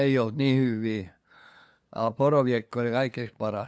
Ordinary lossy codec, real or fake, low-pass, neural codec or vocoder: none; fake; none; codec, 16 kHz, 2 kbps, FunCodec, trained on LibriTTS, 25 frames a second